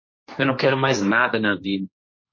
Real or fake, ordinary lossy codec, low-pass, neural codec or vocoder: fake; MP3, 32 kbps; 7.2 kHz; codec, 16 kHz, 1.1 kbps, Voila-Tokenizer